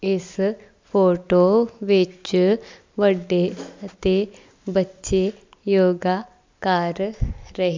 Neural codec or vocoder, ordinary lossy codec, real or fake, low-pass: none; MP3, 64 kbps; real; 7.2 kHz